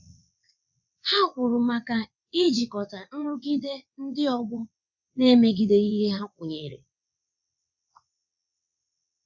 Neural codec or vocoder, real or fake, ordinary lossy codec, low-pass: vocoder, 22.05 kHz, 80 mel bands, WaveNeXt; fake; none; 7.2 kHz